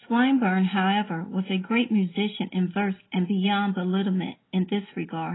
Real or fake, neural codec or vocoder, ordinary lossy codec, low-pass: real; none; AAC, 16 kbps; 7.2 kHz